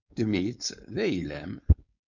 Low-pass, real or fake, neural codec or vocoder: 7.2 kHz; fake; codec, 16 kHz, 4.8 kbps, FACodec